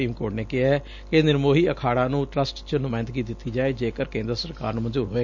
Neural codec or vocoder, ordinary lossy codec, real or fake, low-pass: none; none; real; 7.2 kHz